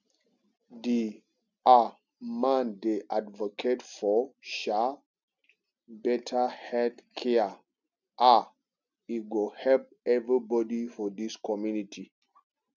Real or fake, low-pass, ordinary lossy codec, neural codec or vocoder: real; 7.2 kHz; none; none